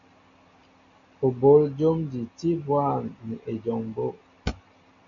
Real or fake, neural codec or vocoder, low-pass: real; none; 7.2 kHz